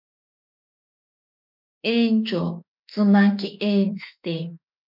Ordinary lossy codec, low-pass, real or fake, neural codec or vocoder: MP3, 48 kbps; 5.4 kHz; fake; autoencoder, 48 kHz, 32 numbers a frame, DAC-VAE, trained on Japanese speech